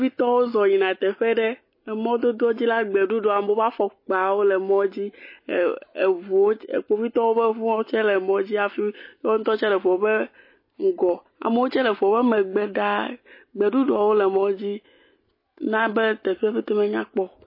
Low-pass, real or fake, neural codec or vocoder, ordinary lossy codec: 5.4 kHz; real; none; MP3, 24 kbps